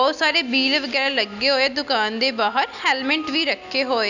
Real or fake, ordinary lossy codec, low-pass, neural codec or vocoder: real; none; 7.2 kHz; none